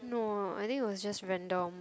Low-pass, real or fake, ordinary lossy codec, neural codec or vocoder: none; real; none; none